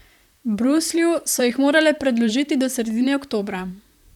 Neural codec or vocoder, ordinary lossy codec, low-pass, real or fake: vocoder, 44.1 kHz, 128 mel bands, Pupu-Vocoder; none; 19.8 kHz; fake